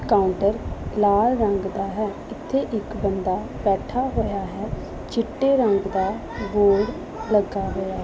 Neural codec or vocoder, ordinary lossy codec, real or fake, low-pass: none; none; real; none